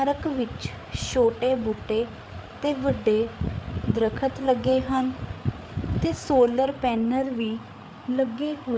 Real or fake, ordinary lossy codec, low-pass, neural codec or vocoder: fake; none; none; codec, 16 kHz, 8 kbps, FreqCodec, larger model